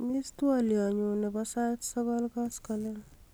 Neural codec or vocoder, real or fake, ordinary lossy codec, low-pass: none; real; none; none